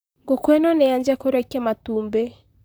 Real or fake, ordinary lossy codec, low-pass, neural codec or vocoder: fake; none; none; vocoder, 44.1 kHz, 128 mel bands, Pupu-Vocoder